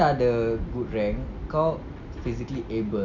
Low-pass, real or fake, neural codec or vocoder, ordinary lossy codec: 7.2 kHz; real; none; none